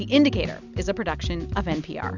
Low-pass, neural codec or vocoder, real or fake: 7.2 kHz; none; real